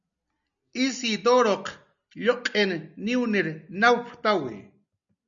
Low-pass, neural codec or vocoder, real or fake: 7.2 kHz; none; real